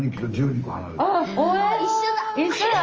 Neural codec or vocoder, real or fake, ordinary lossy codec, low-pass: none; real; Opus, 24 kbps; 7.2 kHz